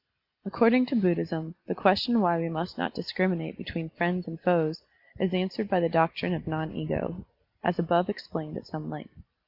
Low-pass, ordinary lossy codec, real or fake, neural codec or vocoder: 5.4 kHz; Opus, 64 kbps; real; none